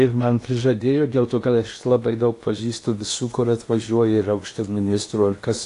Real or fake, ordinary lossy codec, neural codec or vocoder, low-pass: fake; AAC, 48 kbps; codec, 16 kHz in and 24 kHz out, 0.8 kbps, FocalCodec, streaming, 65536 codes; 10.8 kHz